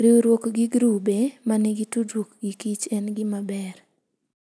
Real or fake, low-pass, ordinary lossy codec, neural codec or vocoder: real; none; none; none